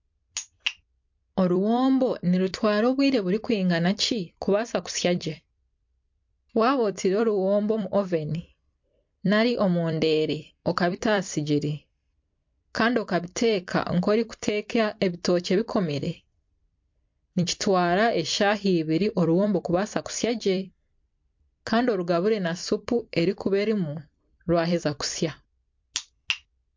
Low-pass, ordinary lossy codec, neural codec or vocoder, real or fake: 7.2 kHz; MP3, 48 kbps; vocoder, 44.1 kHz, 128 mel bands every 256 samples, BigVGAN v2; fake